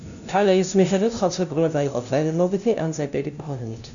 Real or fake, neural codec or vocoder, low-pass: fake; codec, 16 kHz, 0.5 kbps, FunCodec, trained on LibriTTS, 25 frames a second; 7.2 kHz